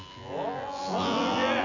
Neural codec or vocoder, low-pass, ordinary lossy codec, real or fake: vocoder, 24 kHz, 100 mel bands, Vocos; 7.2 kHz; none; fake